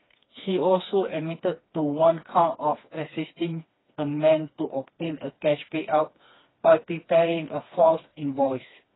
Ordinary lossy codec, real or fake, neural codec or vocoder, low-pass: AAC, 16 kbps; fake; codec, 16 kHz, 2 kbps, FreqCodec, smaller model; 7.2 kHz